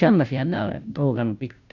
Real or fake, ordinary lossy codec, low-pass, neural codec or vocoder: fake; none; 7.2 kHz; codec, 16 kHz, 0.5 kbps, FunCodec, trained on Chinese and English, 25 frames a second